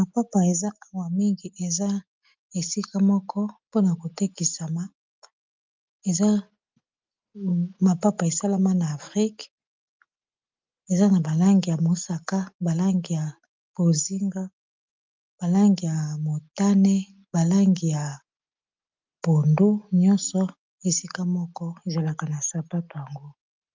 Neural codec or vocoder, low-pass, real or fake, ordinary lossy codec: none; 7.2 kHz; real; Opus, 24 kbps